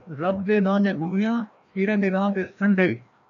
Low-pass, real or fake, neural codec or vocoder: 7.2 kHz; fake; codec, 16 kHz, 1 kbps, FreqCodec, larger model